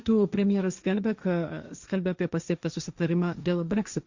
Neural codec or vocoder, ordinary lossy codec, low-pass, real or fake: codec, 16 kHz, 1.1 kbps, Voila-Tokenizer; Opus, 64 kbps; 7.2 kHz; fake